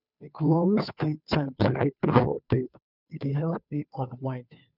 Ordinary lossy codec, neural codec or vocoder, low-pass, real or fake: none; codec, 16 kHz, 2 kbps, FunCodec, trained on Chinese and English, 25 frames a second; 5.4 kHz; fake